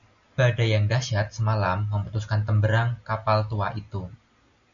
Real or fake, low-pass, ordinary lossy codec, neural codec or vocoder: real; 7.2 kHz; MP3, 96 kbps; none